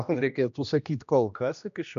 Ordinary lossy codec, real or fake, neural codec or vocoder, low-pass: MP3, 96 kbps; fake; codec, 16 kHz, 1 kbps, X-Codec, HuBERT features, trained on general audio; 7.2 kHz